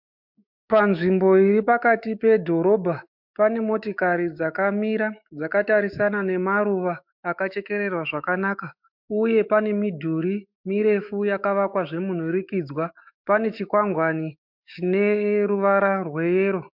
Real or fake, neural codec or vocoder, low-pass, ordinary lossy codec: fake; autoencoder, 48 kHz, 128 numbers a frame, DAC-VAE, trained on Japanese speech; 5.4 kHz; MP3, 48 kbps